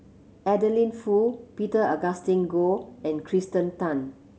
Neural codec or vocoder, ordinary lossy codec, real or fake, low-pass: none; none; real; none